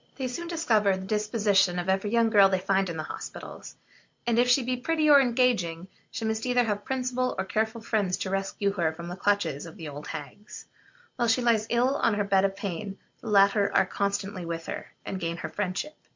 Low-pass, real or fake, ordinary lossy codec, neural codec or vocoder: 7.2 kHz; real; MP3, 48 kbps; none